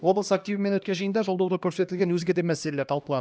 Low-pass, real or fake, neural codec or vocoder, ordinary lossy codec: none; fake; codec, 16 kHz, 1 kbps, X-Codec, HuBERT features, trained on LibriSpeech; none